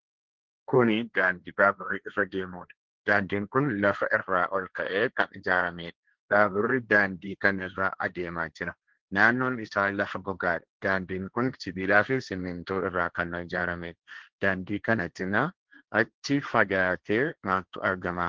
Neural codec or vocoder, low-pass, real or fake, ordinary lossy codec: codec, 16 kHz, 1.1 kbps, Voila-Tokenizer; 7.2 kHz; fake; Opus, 16 kbps